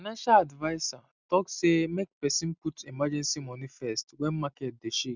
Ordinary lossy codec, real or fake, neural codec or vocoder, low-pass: none; real; none; 7.2 kHz